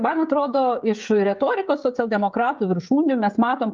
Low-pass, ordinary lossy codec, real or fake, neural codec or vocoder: 7.2 kHz; Opus, 24 kbps; fake; codec, 16 kHz, 16 kbps, FreqCodec, smaller model